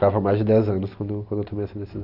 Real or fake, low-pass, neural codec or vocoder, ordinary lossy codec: real; 5.4 kHz; none; none